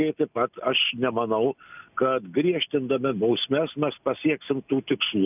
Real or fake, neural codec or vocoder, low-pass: real; none; 3.6 kHz